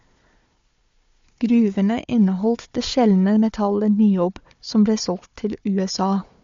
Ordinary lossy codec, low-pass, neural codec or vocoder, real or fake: MP3, 48 kbps; 7.2 kHz; codec, 16 kHz, 4 kbps, FunCodec, trained on Chinese and English, 50 frames a second; fake